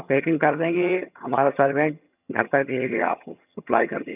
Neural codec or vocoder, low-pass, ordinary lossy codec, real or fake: vocoder, 22.05 kHz, 80 mel bands, HiFi-GAN; 3.6 kHz; none; fake